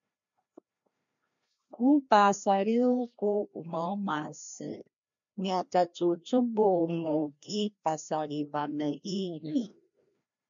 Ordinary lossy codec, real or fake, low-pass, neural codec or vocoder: MP3, 64 kbps; fake; 7.2 kHz; codec, 16 kHz, 1 kbps, FreqCodec, larger model